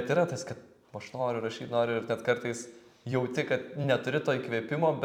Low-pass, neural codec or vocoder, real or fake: 19.8 kHz; vocoder, 44.1 kHz, 128 mel bands every 256 samples, BigVGAN v2; fake